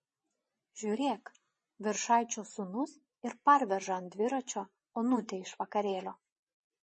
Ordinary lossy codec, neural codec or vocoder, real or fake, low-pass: MP3, 32 kbps; vocoder, 24 kHz, 100 mel bands, Vocos; fake; 10.8 kHz